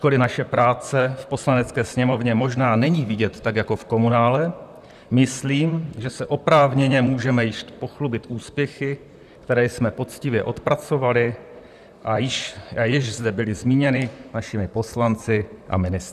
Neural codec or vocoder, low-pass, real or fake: vocoder, 44.1 kHz, 128 mel bands, Pupu-Vocoder; 14.4 kHz; fake